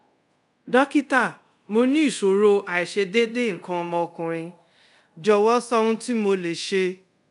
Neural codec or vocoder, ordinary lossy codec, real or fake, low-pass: codec, 24 kHz, 0.5 kbps, DualCodec; none; fake; 10.8 kHz